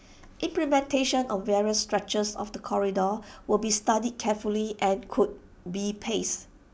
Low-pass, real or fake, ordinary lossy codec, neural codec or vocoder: none; real; none; none